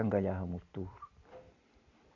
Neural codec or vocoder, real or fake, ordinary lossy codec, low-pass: none; real; MP3, 48 kbps; 7.2 kHz